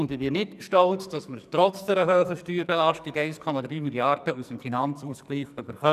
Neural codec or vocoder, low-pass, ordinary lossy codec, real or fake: codec, 44.1 kHz, 2.6 kbps, SNAC; 14.4 kHz; none; fake